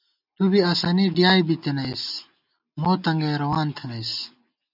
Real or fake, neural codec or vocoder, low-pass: real; none; 5.4 kHz